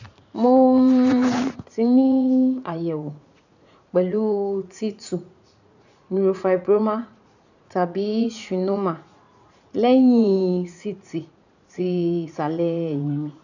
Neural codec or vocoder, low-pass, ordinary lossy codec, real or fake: vocoder, 44.1 kHz, 80 mel bands, Vocos; 7.2 kHz; none; fake